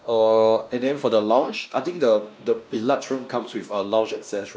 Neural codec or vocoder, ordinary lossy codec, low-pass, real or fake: codec, 16 kHz, 1 kbps, X-Codec, WavLM features, trained on Multilingual LibriSpeech; none; none; fake